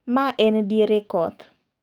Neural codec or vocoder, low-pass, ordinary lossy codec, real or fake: codec, 44.1 kHz, 7.8 kbps, DAC; 19.8 kHz; none; fake